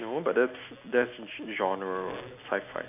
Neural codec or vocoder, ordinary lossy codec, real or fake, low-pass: none; none; real; 3.6 kHz